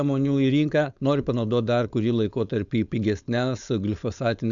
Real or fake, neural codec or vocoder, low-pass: fake; codec, 16 kHz, 4.8 kbps, FACodec; 7.2 kHz